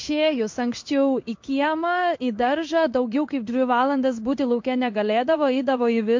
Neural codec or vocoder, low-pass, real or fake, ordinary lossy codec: codec, 16 kHz in and 24 kHz out, 1 kbps, XY-Tokenizer; 7.2 kHz; fake; MP3, 48 kbps